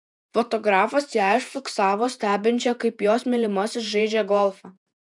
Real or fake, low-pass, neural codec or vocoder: fake; 10.8 kHz; vocoder, 48 kHz, 128 mel bands, Vocos